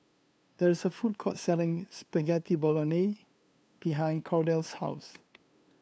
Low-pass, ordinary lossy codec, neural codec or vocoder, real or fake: none; none; codec, 16 kHz, 2 kbps, FunCodec, trained on LibriTTS, 25 frames a second; fake